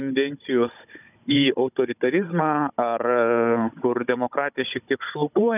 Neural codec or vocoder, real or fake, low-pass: codec, 16 kHz, 16 kbps, FunCodec, trained on Chinese and English, 50 frames a second; fake; 3.6 kHz